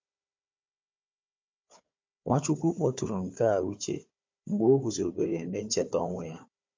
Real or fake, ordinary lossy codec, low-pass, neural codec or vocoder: fake; MP3, 48 kbps; 7.2 kHz; codec, 16 kHz, 4 kbps, FunCodec, trained on Chinese and English, 50 frames a second